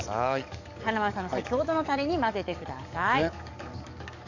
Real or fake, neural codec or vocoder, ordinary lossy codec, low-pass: fake; codec, 44.1 kHz, 7.8 kbps, DAC; none; 7.2 kHz